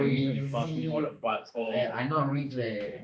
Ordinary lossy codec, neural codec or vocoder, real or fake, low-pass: none; codec, 16 kHz, 2 kbps, X-Codec, HuBERT features, trained on balanced general audio; fake; none